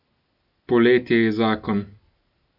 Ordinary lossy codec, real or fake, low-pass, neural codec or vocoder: none; real; 5.4 kHz; none